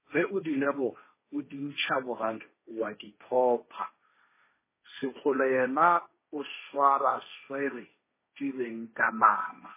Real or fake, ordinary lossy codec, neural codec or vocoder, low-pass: fake; MP3, 16 kbps; codec, 16 kHz, 1.1 kbps, Voila-Tokenizer; 3.6 kHz